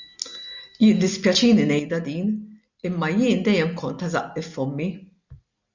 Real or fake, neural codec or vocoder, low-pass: real; none; 7.2 kHz